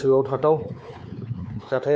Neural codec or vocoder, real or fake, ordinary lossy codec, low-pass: codec, 16 kHz, 4 kbps, X-Codec, WavLM features, trained on Multilingual LibriSpeech; fake; none; none